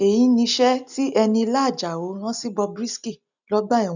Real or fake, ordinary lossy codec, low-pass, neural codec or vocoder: real; none; 7.2 kHz; none